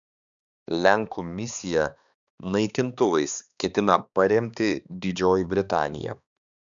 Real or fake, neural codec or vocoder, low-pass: fake; codec, 16 kHz, 2 kbps, X-Codec, HuBERT features, trained on balanced general audio; 7.2 kHz